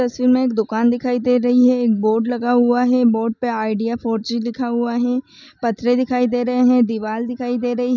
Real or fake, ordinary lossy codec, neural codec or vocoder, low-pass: real; none; none; 7.2 kHz